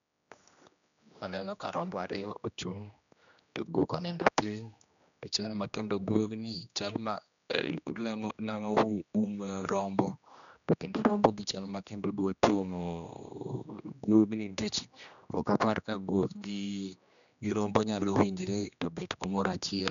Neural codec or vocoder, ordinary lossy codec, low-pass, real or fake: codec, 16 kHz, 1 kbps, X-Codec, HuBERT features, trained on general audio; none; 7.2 kHz; fake